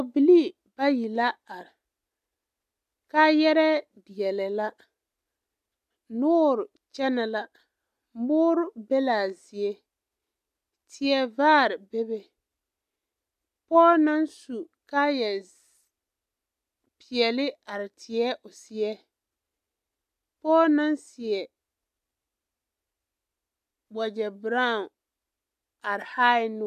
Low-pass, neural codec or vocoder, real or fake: 14.4 kHz; none; real